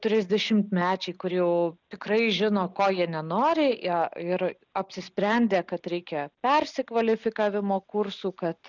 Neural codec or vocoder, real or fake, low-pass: none; real; 7.2 kHz